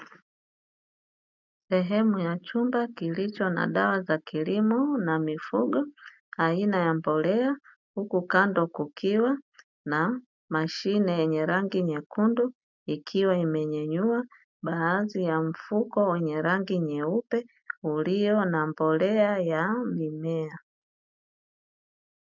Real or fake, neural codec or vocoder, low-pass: real; none; 7.2 kHz